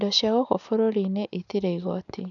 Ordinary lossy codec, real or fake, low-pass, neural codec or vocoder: none; real; 7.2 kHz; none